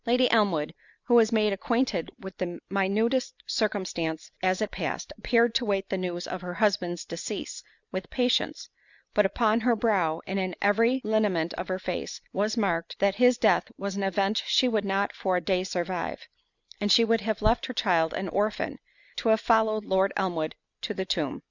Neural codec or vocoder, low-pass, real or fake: none; 7.2 kHz; real